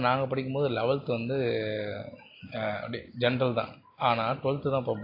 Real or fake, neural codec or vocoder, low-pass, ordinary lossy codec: real; none; 5.4 kHz; AAC, 32 kbps